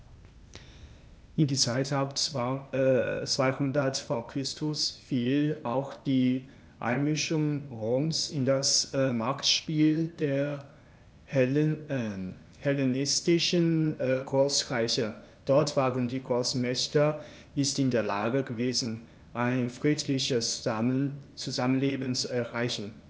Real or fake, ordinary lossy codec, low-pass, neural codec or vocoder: fake; none; none; codec, 16 kHz, 0.8 kbps, ZipCodec